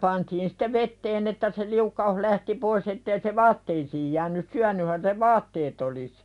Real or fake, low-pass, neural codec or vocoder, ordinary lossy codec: real; 10.8 kHz; none; none